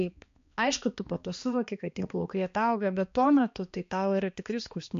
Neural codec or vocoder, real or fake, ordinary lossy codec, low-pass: codec, 16 kHz, 2 kbps, X-Codec, HuBERT features, trained on balanced general audio; fake; MP3, 48 kbps; 7.2 kHz